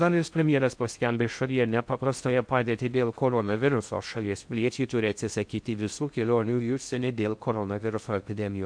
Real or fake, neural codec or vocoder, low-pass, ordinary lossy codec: fake; codec, 16 kHz in and 24 kHz out, 0.6 kbps, FocalCodec, streaming, 2048 codes; 9.9 kHz; MP3, 64 kbps